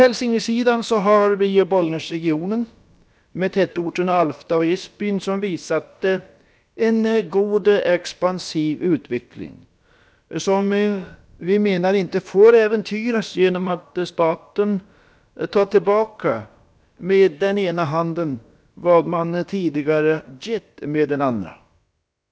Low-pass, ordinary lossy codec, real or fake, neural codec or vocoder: none; none; fake; codec, 16 kHz, about 1 kbps, DyCAST, with the encoder's durations